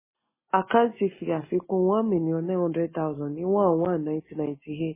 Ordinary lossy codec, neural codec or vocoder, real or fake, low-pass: MP3, 16 kbps; none; real; 3.6 kHz